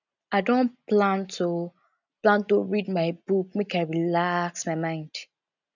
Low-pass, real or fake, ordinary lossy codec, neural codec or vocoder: 7.2 kHz; real; none; none